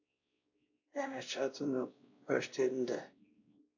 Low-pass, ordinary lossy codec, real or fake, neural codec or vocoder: 7.2 kHz; AAC, 48 kbps; fake; codec, 16 kHz, 1 kbps, X-Codec, WavLM features, trained on Multilingual LibriSpeech